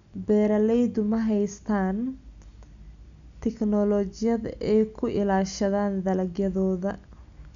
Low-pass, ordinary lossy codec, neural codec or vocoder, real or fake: 7.2 kHz; none; none; real